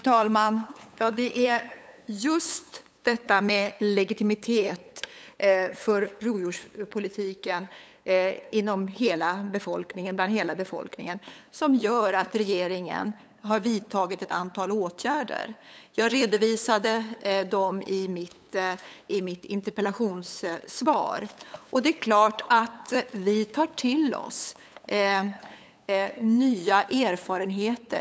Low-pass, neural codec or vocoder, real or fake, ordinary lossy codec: none; codec, 16 kHz, 8 kbps, FunCodec, trained on LibriTTS, 25 frames a second; fake; none